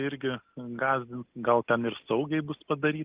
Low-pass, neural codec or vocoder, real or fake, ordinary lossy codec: 3.6 kHz; none; real; Opus, 24 kbps